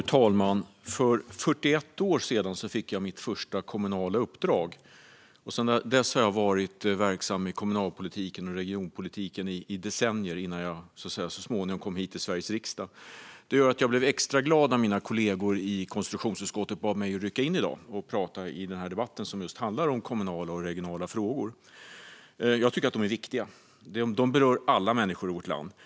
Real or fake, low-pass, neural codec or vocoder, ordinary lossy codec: real; none; none; none